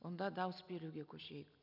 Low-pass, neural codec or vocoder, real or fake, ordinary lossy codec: 5.4 kHz; none; real; none